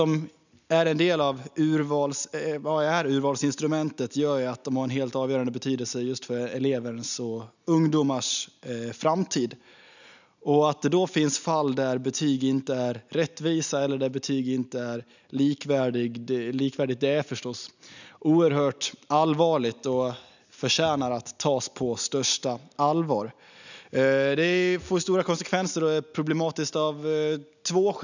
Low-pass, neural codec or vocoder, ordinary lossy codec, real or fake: 7.2 kHz; none; none; real